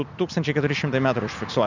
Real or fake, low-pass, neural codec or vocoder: real; 7.2 kHz; none